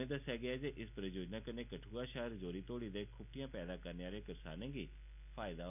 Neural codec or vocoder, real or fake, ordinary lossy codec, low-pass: none; real; none; 3.6 kHz